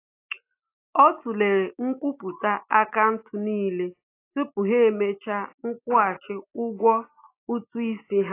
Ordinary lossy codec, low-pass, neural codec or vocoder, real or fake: AAC, 24 kbps; 3.6 kHz; none; real